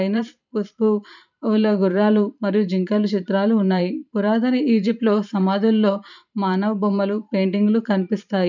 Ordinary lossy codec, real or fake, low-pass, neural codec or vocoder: none; fake; 7.2 kHz; vocoder, 44.1 kHz, 128 mel bands every 512 samples, BigVGAN v2